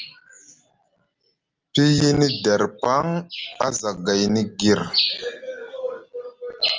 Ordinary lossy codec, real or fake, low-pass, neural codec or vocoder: Opus, 24 kbps; real; 7.2 kHz; none